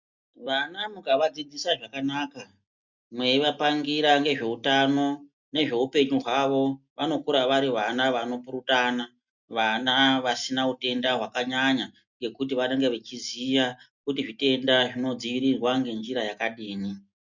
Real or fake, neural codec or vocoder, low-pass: real; none; 7.2 kHz